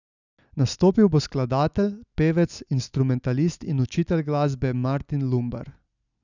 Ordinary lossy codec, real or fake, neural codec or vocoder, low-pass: none; real; none; 7.2 kHz